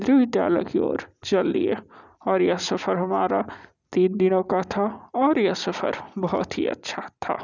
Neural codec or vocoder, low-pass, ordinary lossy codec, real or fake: none; 7.2 kHz; none; real